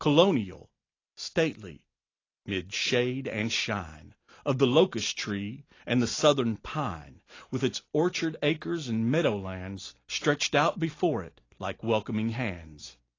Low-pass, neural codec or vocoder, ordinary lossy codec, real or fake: 7.2 kHz; none; AAC, 32 kbps; real